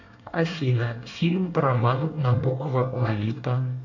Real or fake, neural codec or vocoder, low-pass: fake; codec, 24 kHz, 1 kbps, SNAC; 7.2 kHz